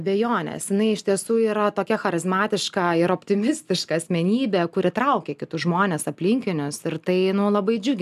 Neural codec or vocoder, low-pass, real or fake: none; 14.4 kHz; real